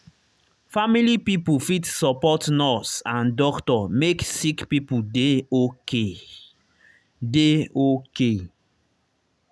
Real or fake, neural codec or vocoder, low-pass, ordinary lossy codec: real; none; none; none